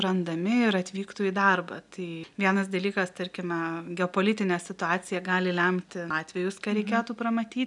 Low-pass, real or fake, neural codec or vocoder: 10.8 kHz; real; none